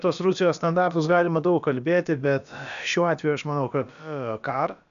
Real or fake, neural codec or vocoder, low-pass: fake; codec, 16 kHz, about 1 kbps, DyCAST, with the encoder's durations; 7.2 kHz